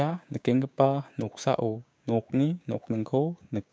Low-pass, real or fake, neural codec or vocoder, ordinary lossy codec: none; fake; codec, 16 kHz, 6 kbps, DAC; none